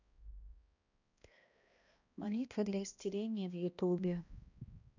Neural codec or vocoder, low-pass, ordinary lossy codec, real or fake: codec, 16 kHz, 1 kbps, X-Codec, HuBERT features, trained on balanced general audio; 7.2 kHz; none; fake